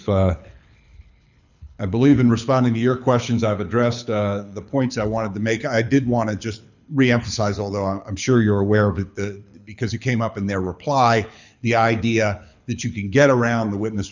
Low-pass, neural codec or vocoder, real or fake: 7.2 kHz; codec, 24 kHz, 6 kbps, HILCodec; fake